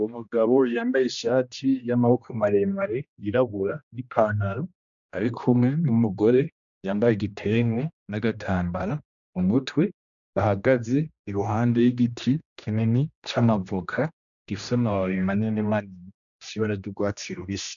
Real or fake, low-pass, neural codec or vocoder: fake; 7.2 kHz; codec, 16 kHz, 1 kbps, X-Codec, HuBERT features, trained on general audio